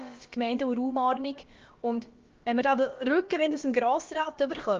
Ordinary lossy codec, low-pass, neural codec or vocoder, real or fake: Opus, 32 kbps; 7.2 kHz; codec, 16 kHz, about 1 kbps, DyCAST, with the encoder's durations; fake